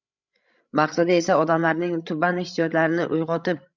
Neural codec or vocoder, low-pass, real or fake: codec, 16 kHz, 16 kbps, FreqCodec, larger model; 7.2 kHz; fake